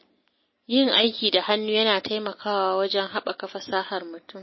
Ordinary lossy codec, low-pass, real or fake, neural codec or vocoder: MP3, 24 kbps; 7.2 kHz; real; none